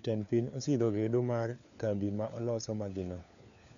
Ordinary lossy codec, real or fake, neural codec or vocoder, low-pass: none; fake; codec, 16 kHz, 4 kbps, FunCodec, trained on LibriTTS, 50 frames a second; 7.2 kHz